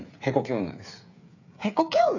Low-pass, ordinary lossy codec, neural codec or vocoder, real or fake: 7.2 kHz; none; codec, 16 kHz, 8 kbps, FreqCodec, smaller model; fake